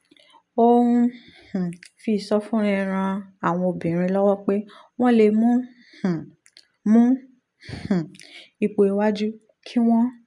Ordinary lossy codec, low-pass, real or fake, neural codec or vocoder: none; 10.8 kHz; real; none